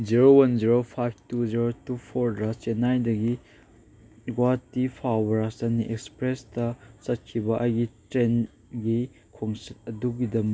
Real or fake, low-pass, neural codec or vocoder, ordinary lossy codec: real; none; none; none